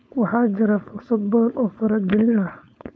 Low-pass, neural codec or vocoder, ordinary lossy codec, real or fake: none; codec, 16 kHz, 4.8 kbps, FACodec; none; fake